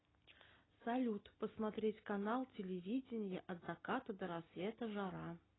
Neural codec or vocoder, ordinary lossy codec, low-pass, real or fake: none; AAC, 16 kbps; 7.2 kHz; real